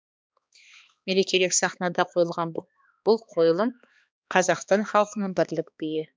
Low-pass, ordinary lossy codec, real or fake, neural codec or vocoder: none; none; fake; codec, 16 kHz, 2 kbps, X-Codec, HuBERT features, trained on balanced general audio